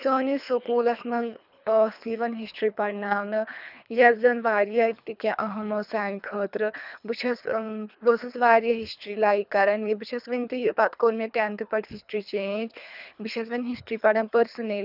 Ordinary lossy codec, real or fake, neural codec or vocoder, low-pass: none; fake; codec, 24 kHz, 3 kbps, HILCodec; 5.4 kHz